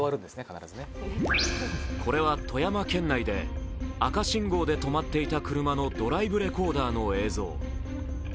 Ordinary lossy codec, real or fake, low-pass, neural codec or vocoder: none; real; none; none